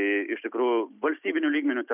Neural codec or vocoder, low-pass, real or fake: none; 3.6 kHz; real